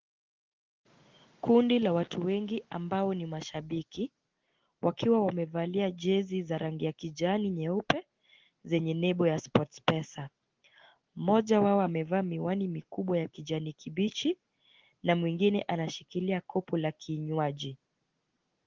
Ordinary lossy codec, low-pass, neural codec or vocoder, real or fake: Opus, 16 kbps; 7.2 kHz; none; real